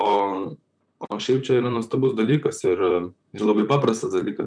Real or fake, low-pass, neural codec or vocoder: fake; 9.9 kHz; vocoder, 44.1 kHz, 128 mel bands, Pupu-Vocoder